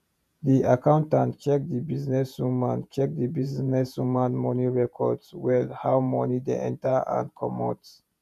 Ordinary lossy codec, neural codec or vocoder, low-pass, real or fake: none; vocoder, 48 kHz, 128 mel bands, Vocos; 14.4 kHz; fake